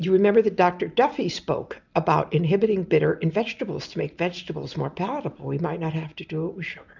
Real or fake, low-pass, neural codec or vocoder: real; 7.2 kHz; none